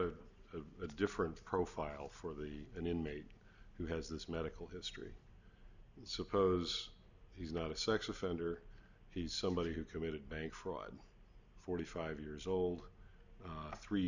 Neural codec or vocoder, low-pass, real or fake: none; 7.2 kHz; real